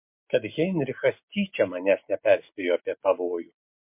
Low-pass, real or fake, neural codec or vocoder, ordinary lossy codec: 3.6 kHz; real; none; MP3, 32 kbps